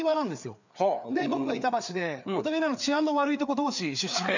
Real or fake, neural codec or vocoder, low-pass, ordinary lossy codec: fake; codec, 16 kHz, 4 kbps, FreqCodec, larger model; 7.2 kHz; none